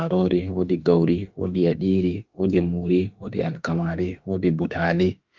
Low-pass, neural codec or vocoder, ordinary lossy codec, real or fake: 7.2 kHz; autoencoder, 48 kHz, 32 numbers a frame, DAC-VAE, trained on Japanese speech; Opus, 24 kbps; fake